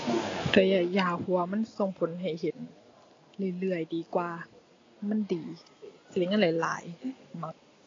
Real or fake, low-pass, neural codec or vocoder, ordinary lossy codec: real; 7.2 kHz; none; AAC, 32 kbps